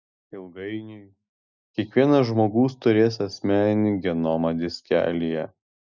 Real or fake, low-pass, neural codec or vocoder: real; 7.2 kHz; none